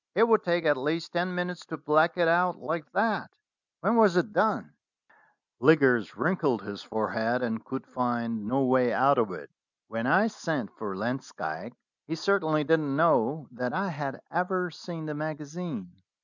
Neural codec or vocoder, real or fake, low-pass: none; real; 7.2 kHz